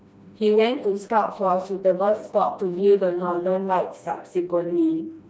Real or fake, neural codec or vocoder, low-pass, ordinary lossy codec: fake; codec, 16 kHz, 1 kbps, FreqCodec, smaller model; none; none